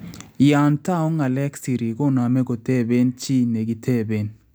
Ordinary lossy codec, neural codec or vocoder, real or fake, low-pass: none; none; real; none